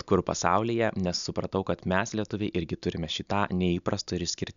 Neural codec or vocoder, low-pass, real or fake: none; 7.2 kHz; real